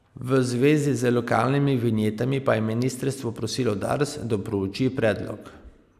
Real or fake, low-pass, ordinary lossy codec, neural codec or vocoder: real; 14.4 kHz; none; none